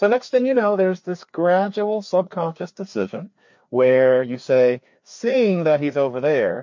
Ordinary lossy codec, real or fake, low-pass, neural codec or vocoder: MP3, 48 kbps; fake; 7.2 kHz; codec, 16 kHz, 2 kbps, FreqCodec, larger model